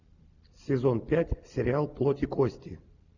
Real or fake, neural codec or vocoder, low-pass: real; none; 7.2 kHz